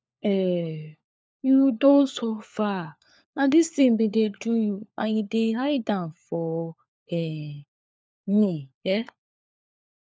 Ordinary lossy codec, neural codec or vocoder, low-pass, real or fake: none; codec, 16 kHz, 4 kbps, FunCodec, trained on LibriTTS, 50 frames a second; none; fake